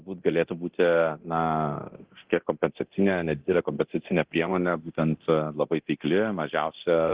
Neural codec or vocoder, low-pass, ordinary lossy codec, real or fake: codec, 24 kHz, 0.9 kbps, DualCodec; 3.6 kHz; Opus, 16 kbps; fake